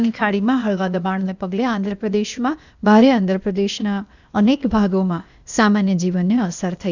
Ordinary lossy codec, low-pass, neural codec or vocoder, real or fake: none; 7.2 kHz; codec, 16 kHz, 0.8 kbps, ZipCodec; fake